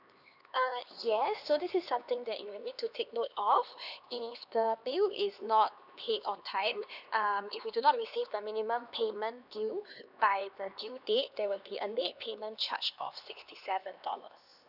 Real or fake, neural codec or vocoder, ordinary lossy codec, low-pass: fake; codec, 16 kHz, 2 kbps, X-Codec, HuBERT features, trained on LibriSpeech; none; 5.4 kHz